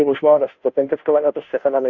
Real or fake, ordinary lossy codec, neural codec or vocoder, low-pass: fake; Opus, 64 kbps; codec, 16 kHz, 0.5 kbps, FunCodec, trained on Chinese and English, 25 frames a second; 7.2 kHz